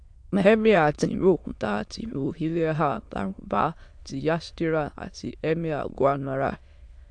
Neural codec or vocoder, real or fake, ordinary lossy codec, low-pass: autoencoder, 22.05 kHz, a latent of 192 numbers a frame, VITS, trained on many speakers; fake; AAC, 64 kbps; 9.9 kHz